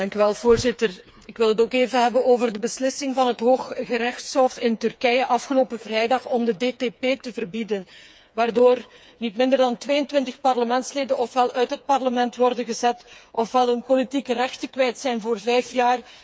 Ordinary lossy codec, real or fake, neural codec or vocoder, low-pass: none; fake; codec, 16 kHz, 4 kbps, FreqCodec, smaller model; none